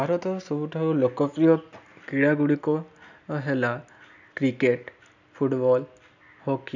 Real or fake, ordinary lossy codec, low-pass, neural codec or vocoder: real; none; 7.2 kHz; none